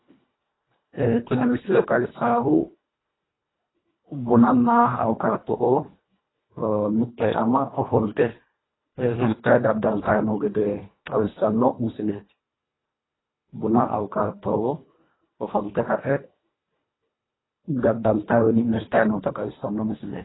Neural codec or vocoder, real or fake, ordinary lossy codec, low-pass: codec, 24 kHz, 1.5 kbps, HILCodec; fake; AAC, 16 kbps; 7.2 kHz